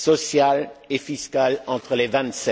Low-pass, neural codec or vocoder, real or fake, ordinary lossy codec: none; none; real; none